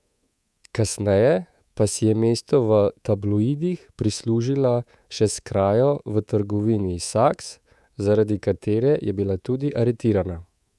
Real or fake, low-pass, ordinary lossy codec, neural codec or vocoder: fake; none; none; codec, 24 kHz, 3.1 kbps, DualCodec